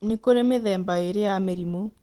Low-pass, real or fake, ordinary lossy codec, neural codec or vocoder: 19.8 kHz; fake; Opus, 16 kbps; vocoder, 44.1 kHz, 128 mel bands every 512 samples, BigVGAN v2